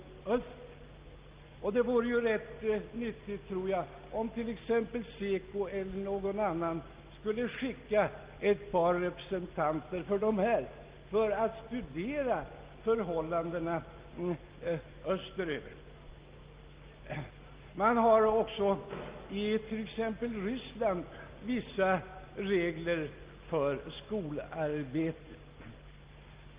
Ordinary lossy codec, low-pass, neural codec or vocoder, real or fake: Opus, 32 kbps; 3.6 kHz; none; real